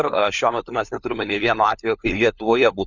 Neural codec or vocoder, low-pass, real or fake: codec, 16 kHz, 4 kbps, FunCodec, trained on LibriTTS, 50 frames a second; 7.2 kHz; fake